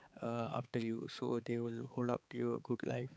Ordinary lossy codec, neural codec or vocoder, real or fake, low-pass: none; codec, 16 kHz, 4 kbps, X-Codec, HuBERT features, trained on balanced general audio; fake; none